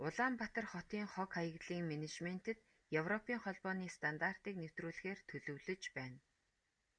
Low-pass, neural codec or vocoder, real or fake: 9.9 kHz; none; real